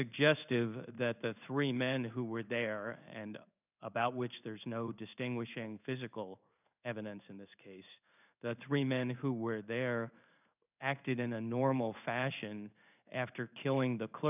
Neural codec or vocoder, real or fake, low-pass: codec, 16 kHz in and 24 kHz out, 1 kbps, XY-Tokenizer; fake; 3.6 kHz